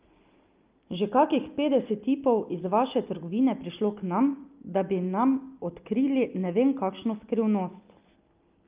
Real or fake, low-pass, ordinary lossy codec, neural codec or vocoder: real; 3.6 kHz; Opus, 24 kbps; none